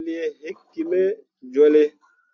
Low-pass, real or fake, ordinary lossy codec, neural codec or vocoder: 7.2 kHz; real; AAC, 48 kbps; none